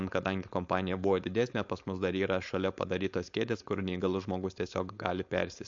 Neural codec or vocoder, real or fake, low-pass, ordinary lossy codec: codec, 16 kHz, 4.8 kbps, FACodec; fake; 7.2 kHz; MP3, 48 kbps